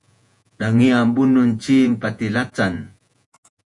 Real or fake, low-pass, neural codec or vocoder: fake; 10.8 kHz; vocoder, 48 kHz, 128 mel bands, Vocos